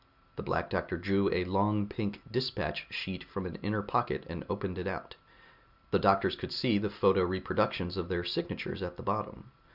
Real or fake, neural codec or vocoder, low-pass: real; none; 5.4 kHz